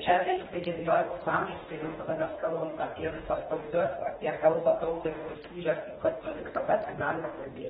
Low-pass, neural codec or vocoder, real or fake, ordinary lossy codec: 10.8 kHz; codec, 24 kHz, 1.5 kbps, HILCodec; fake; AAC, 16 kbps